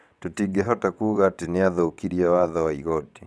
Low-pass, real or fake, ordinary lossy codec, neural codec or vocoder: none; fake; none; vocoder, 22.05 kHz, 80 mel bands, WaveNeXt